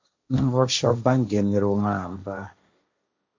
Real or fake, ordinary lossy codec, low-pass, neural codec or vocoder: fake; MP3, 48 kbps; 7.2 kHz; codec, 16 kHz, 1.1 kbps, Voila-Tokenizer